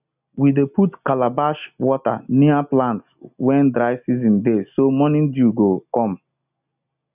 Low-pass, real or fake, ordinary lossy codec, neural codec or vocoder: 3.6 kHz; real; none; none